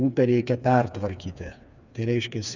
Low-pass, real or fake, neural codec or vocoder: 7.2 kHz; fake; codec, 16 kHz, 4 kbps, FreqCodec, smaller model